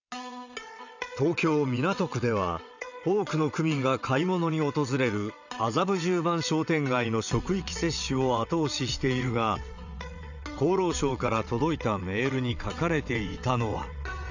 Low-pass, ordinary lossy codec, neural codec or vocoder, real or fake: 7.2 kHz; none; vocoder, 22.05 kHz, 80 mel bands, WaveNeXt; fake